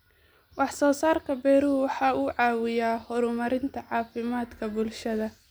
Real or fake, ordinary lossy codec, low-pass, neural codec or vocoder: real; none; none; none